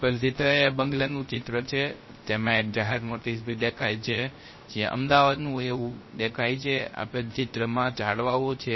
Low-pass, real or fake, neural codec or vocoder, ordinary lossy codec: 7.2 kHz; fake; codec, 16 kHz, 0.3 kbps, FocalCodec; MP3, 24 kbps